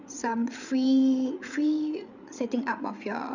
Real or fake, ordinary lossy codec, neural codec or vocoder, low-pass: fake; none; codec, 16 kHz, 8 kbps, FreqCodec, larger model; 7.2 kHz